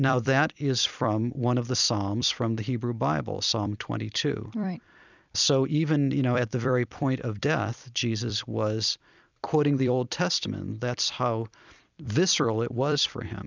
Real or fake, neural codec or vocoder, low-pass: fake; vocoder, 44.1 kHz, 128 mel bands every 256 samples, BigVGAN v2; 7.2 kHz